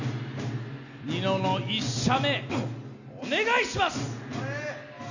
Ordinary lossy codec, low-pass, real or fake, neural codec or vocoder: none; 7.2 kHz; real; none